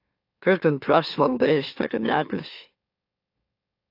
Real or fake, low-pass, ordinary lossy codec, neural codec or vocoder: fake; 5.4 kHz; AAC, 32 kbps; autoencoder, 44.1 kHz, a latent of 192 numbers a frame, MeloTTS